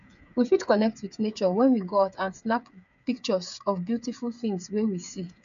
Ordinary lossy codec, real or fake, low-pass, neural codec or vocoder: none; fake; 7.2 kHz; codec, 16 kHz, 8 kbps, FreqCodec, smaller model